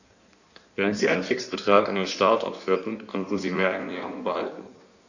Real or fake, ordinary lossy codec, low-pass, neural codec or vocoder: fake; none; 7.2 kHz; codec, 16 kHz in and 24 kHz out, 1.1 kbps, FireRedTTS-2 codec